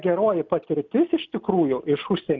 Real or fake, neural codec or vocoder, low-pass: real; none; 7.2 kHz